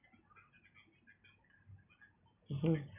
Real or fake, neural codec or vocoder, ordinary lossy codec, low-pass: real; none; none; 3.6 kHz